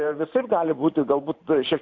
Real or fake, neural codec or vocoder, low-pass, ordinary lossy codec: fake; vocoder, 44.1 kHz, 128 mel bands every 512 samples, BigVGAN v2; 7.2 kHz; AAC, 48 kbps